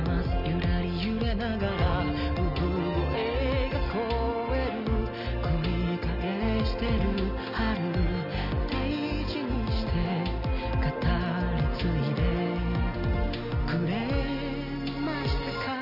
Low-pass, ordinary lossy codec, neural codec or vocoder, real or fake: 5.4 kHz; none; none; real